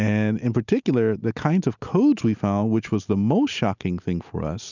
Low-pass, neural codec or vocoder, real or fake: 7.2 kHz; none; real